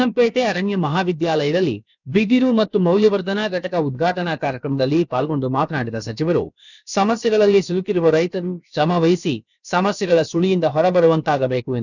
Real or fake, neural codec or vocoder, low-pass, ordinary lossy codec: fake; codec, 16 kHz, about 1 kbps, DyCAST, with the encoder's durations; 7.2 kHz; none